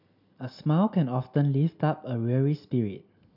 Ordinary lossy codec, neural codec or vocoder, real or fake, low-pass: none; none; real; 5.4 kHz